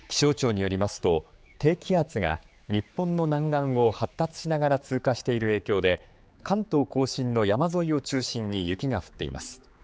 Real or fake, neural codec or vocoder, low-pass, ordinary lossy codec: fake; codec, 16 kHz, 4 kbps, X-Codec, HuBERT features, trained on general audio; none; none